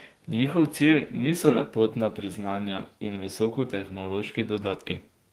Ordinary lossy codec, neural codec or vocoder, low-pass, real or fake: Opus, 24 kbps; codec, 32 kHz, 1.9 kbps, SNAC; 14.4 kHz; fake